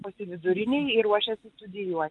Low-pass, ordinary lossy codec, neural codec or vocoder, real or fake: 10.8 kHz; Opus, 32 kbps; none; real